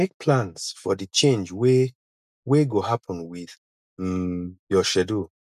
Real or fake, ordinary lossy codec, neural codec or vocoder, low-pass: real; none; none; 14.4 kHz